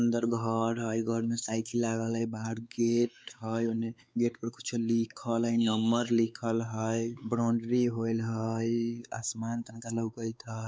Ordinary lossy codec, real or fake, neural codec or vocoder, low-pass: none; fake; codec, 16 kHz, 4 kbps, X-Codec, WavLM features, trained on Multilingual LibriSpeech; none